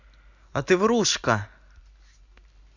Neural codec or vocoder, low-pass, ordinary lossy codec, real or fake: none; 7.2 kHz; Opus, 64 kbps; real